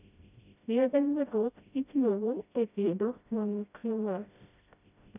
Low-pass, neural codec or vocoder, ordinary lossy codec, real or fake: 3.6 kHz; codec, 16 kHz, 0.5 kbps, FreqCodec, smaller model; none; fake